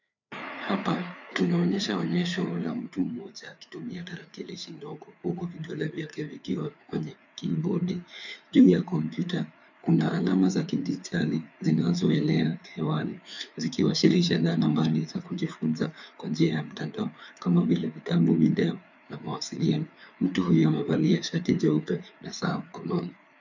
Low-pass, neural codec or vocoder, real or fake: 7.2 kHz; codec, 16 kHz, 4 kbps, FreqCodec, larger model; fake